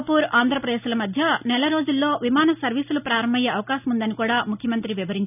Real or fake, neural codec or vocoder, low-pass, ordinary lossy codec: real; none; 3.6 kHz; none